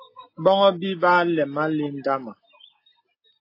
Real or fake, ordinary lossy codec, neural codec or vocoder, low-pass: real; AAC, 32 kbps; none; 5.4 kHz